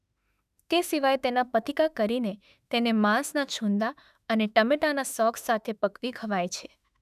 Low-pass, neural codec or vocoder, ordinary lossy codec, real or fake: 14.4 kHz; autoencoder, 48 kHz, 32 numbers a frame, DAC-VAE, trained on Japanese speech; none; fake